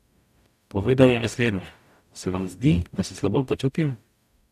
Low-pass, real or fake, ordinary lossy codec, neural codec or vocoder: 14.4 kHz; fake; none; codec, 44.1 kHz, 0.9 kbps, DAC